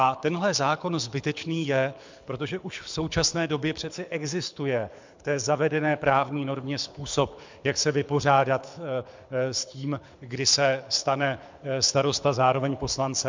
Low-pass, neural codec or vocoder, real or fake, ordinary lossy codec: 7.2 kHz; codec, 24 kHz, 6 kbps, HILCodec; fake; MP3, 64 kbps